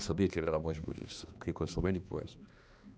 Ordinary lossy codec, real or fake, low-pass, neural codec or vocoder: none; fake; none; codec, 16 kHz, 2 kbps, X-Codec, HuBERT features, trained on balanced general audio